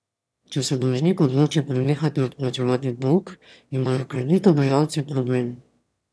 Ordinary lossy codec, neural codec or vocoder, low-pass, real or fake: none; autoencoder, 22.05 kHz, a latent of 192 numbers a frame, VITS, trained on one speaker; none; fake